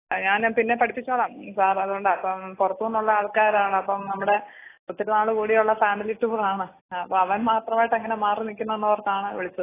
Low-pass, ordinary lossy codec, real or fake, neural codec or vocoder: 3.6 kHz; AAC, 16 kbps; real; none